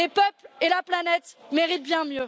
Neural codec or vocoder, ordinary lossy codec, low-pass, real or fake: none; none; none; real